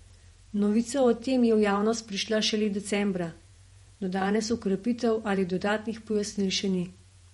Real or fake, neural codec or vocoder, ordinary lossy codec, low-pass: fake; vocoder, 48 kHz, 128 mel bands, Vocos; MP3, 48 kbps; 19.8 kHz